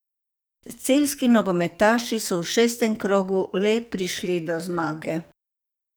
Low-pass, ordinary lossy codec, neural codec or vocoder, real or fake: none; none; codec, 44.1 kHz, 2.6 kbps, SNAC; fake